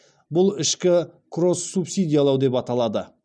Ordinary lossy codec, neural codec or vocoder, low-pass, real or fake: none; none; 9.9 kHz; real